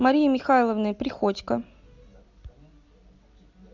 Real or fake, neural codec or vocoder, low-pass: real; none; 7.2 kHz